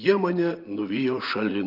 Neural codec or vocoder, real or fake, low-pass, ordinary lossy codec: vocoder, 24 kHz, 100 mel bands, Vocos; fake; 5.4 kHz; Opus, 24 kbps